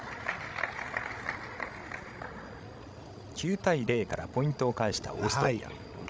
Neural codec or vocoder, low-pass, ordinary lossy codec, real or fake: codec, 16 kHz, 16 kbps, FreqCodec, larger model; none; none; fake